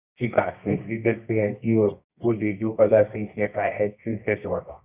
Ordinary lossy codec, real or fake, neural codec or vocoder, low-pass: Opus, 64 kbps; fake; codec, 24 kHz, 0.9 kbps, WavTokenizer, medium music audio release; 3.6 kHz